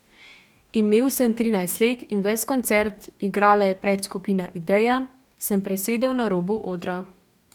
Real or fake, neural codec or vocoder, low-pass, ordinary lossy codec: fake; codec, 44.1 kHz, 2.6 kbps, DAC; 19.8 kHz; none